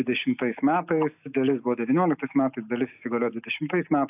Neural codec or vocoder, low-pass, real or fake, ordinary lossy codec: none; 3.6 kHz; real; AAC, 32 kbps